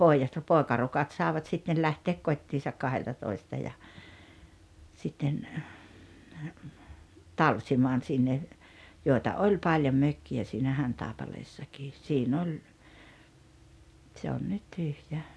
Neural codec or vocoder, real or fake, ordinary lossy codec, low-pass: none; real; none; none